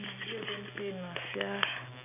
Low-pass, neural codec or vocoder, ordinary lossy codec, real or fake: 3.6 kHz; none; none; real